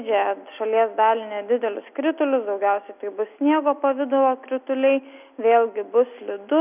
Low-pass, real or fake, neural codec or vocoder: 3.6 kHz; real; none